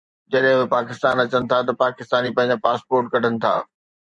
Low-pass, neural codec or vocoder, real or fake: 10.8 kHz; vocoder, 24 kHz, 100 mel bands, Vocos; fake